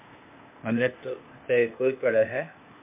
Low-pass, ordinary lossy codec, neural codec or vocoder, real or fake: 3.6 kHz; MP3, 32 kbps; codec, 16 kHz, 0.8 kbps, ZipCodec; fake